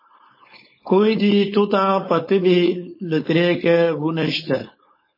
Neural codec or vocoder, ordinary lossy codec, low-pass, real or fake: codec, 16 kHz, 4.8 kbps, FACodec; MP3, 24 kbps; 5.4 kHz; fake